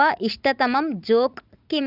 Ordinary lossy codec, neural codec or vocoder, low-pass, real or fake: none; vocoder, 44.1 kHz, 80 mel bands, Vocos; 5.4 kHz; fake